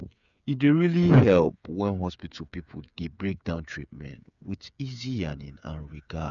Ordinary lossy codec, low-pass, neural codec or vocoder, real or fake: none; 7.2 kHz; codec, 16 kHz, 8 kbps, FreqCodec, smaller model; fake